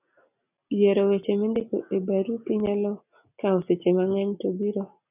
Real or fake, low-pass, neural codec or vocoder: real; 3.6 kHz; none